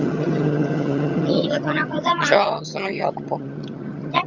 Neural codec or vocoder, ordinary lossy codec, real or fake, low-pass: vocoder, 22.05 kHz, 80 mel bands, HiFi-GAN; Opus, 64 kbps; fake; 7.2 kHz